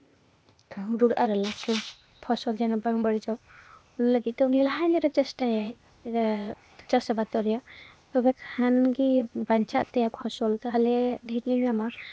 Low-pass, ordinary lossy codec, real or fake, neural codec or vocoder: none; none; fake; codec, 16 kHz, 0.8 kbps, ZipCodec